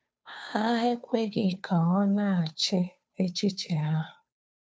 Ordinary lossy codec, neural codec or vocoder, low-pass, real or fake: none; codec, 16 kHz, 2 kbps, FunCodec, trained on Chinese and English, 25 frames a second; none; fake